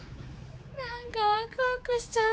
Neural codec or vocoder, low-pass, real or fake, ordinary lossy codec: codec, 16 kHz, 4 kbps, X-Codec, HuBERT features, trained on general audio; none; fake; none